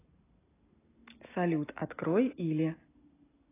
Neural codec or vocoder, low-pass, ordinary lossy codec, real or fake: none; 3.6 kHz; AAC, 16 kbps; real